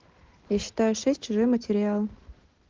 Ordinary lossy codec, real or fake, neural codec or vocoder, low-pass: Opus, 16 kbps; real; none; 7.2 kHz